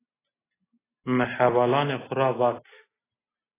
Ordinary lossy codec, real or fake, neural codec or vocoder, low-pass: AAC, 16 kbps; real; none; 3.6 kHz